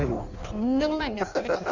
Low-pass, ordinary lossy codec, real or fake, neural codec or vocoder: 7.2 kHz; Opus, 64 kbps; fake; codec, 16 kHz in and 24 kHz out, 1.1 kbps, FireRedTTS-2 codec